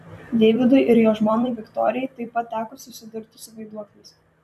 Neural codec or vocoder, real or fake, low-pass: vocoder, 44.1 kHz, 128 mel bands every 256 samples, BigVGAN v2; fake; 14.4 kHz